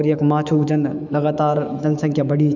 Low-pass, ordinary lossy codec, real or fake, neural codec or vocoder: 7.2 kHz; none; fake; autoencoder, 48 kHz, 128 numbers a frame, DAC-VAE, trained on Japanese speech